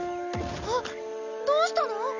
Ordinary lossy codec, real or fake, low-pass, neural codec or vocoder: none; real; 7.2 kHz; none